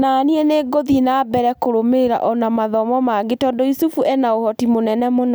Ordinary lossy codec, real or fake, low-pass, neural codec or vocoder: none; real; none; none